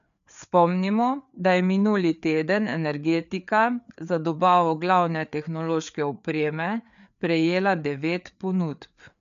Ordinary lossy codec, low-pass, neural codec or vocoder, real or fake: none; 7.2 kHz; codec, 16 kHz, 4 kbps, FreqCodec, larger model; fake